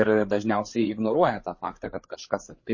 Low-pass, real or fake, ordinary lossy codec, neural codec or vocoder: 7.2 kHz; fake; MP3, 32 kbps; codec, 16 kHz, 8 kbps, FreqCodec, larger model